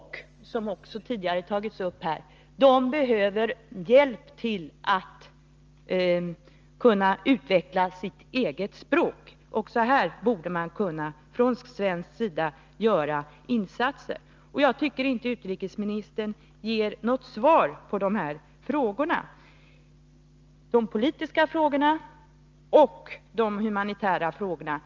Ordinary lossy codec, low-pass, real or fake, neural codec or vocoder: Opus, 24 kbps; 7.2 kHz; real; none